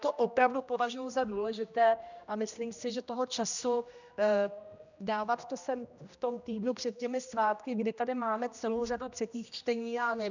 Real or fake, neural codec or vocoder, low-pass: fake; codec, 16 kHz, 1 kbps, X-Codec, HuBERT features, trained on general audio; 7.2 kHz